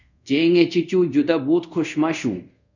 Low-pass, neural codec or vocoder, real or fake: 7.2 kHz; codec, 24 kHz, 0.5 kbps, DualCodec; fake